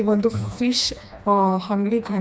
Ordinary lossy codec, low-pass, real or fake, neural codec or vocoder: none; none; fake; codec, 16 kHz, 2 kbps, FreqCodec, smaller model